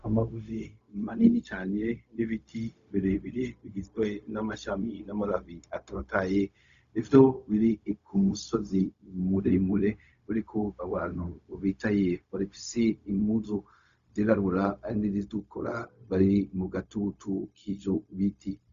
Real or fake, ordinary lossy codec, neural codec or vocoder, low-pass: fake; Opus, 64 kbps; codec, 16 kHz, 0.4 kbps, LongCat-Audio-Codec; 7.2 kHz